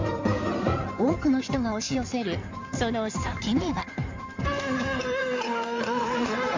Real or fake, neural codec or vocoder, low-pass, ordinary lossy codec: fake; codec, 16 kHz in and 24 kHz out, 2.2 kbps, FireRedTTS-2 codec; 7.2 kHz; MP3, 64 kbps